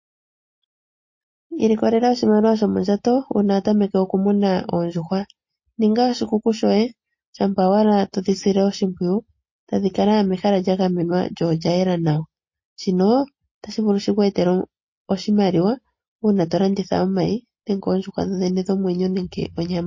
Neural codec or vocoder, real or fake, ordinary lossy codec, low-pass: none; real; MP3, 32 kbps; 7.2 kHz